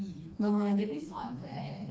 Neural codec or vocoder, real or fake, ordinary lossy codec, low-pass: codec, 16 kHz, 2 kbps, FreqCodec, smaller model; fake; none; none